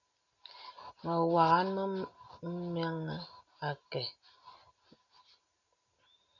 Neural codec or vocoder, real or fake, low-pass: none; real; 7.2 kHz